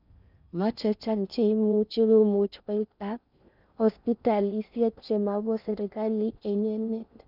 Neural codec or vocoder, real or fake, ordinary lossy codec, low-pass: codec, 16 kHz in and 24 kHz out, 0.6 kbps, FocalCodec, streaming, 4096 codes; fake; none; 5.4 kHz